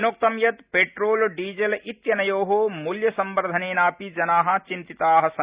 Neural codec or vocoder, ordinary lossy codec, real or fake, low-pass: none; Opus, 64 kbps; real; 3.6 kHz